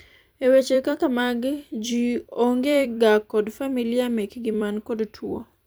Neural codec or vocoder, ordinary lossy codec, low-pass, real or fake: vocoder, 44.1 kHz, 128 mel bands every 256 samples, BigVGAN v2; none; none; fake